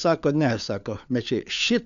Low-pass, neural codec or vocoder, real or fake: 7.2 kHz; codec, 16 kHz, 4 kbps, FunCodec, trained on LibriTTS, 50 frames a second; fake